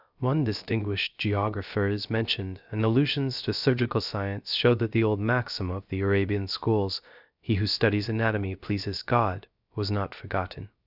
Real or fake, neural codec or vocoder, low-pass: fake; codec, 16 kHz, 0.3 kbps, FocalCodec; 5.4 kHz